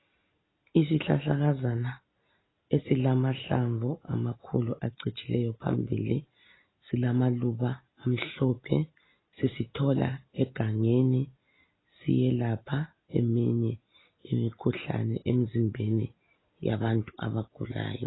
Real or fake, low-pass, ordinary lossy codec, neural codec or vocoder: real; 7.2 kHz; AAC, 16 kbps; none